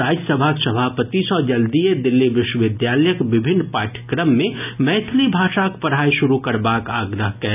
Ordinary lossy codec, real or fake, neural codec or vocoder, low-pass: none; real; none; 3.6 kHz